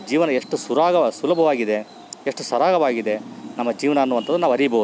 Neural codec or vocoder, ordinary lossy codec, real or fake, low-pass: none; none; real; none